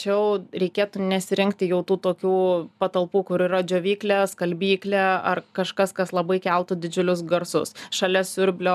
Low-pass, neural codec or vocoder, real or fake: 14.4 kHz; none; real